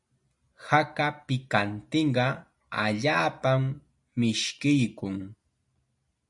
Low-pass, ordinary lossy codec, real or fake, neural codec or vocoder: 10.8 kHz; MP3, 96 kbps; real; none